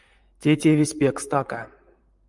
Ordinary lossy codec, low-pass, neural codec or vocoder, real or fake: Opus, 32 kbps; 10.8 kHz; vocoder, 44.1 kHz, 128 mel bands, Pupu-Vocoder; fake